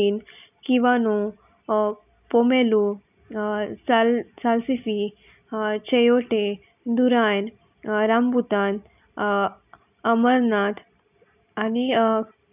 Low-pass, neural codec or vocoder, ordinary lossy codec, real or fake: 3.6 kHz; none; none; real